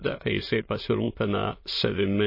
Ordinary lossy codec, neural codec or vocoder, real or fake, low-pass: MP3, 24 kbps; autoencoder, 22.05 kHz, a latent of 192 numbers a frame, VITS, trained on many speakers; fake; 5.4 kHz